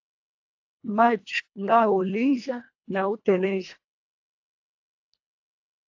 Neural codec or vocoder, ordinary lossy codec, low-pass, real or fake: codec, 24 kHz, 1.5 kbps, HILCodec; AAC, 48 kbps; 7.2 kHz; fake